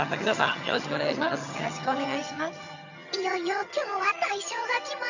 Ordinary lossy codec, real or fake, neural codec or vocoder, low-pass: none; fake; vocoder, 22.05 kHz, 80 mel bands, HiFi-GAN; 7.2 kHz